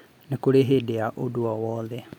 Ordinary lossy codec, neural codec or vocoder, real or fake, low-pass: none; none; real; 19.8 kHz